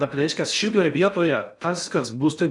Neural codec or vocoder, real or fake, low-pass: codec, 16 kHz in and 24 kHz out, 0.6 kbps, FocalCodec, streaming, 4096 codes; fake; 10.8 kHz